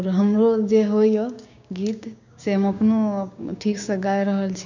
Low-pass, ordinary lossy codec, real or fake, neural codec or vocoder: 7.2 kHz; none; fake; codec, 16 kHz, 6 kbps, DAC